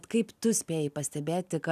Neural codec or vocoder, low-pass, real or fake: none; 14.4 kHz; real